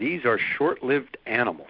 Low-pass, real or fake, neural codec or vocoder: 5.4 kHz; real; none